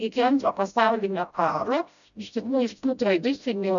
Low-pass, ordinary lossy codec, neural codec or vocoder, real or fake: 7.2 kHz; MP3, 96 kbps; codec, 16 kHz, 0.5 kbps, FreqCodec, smaller model; fake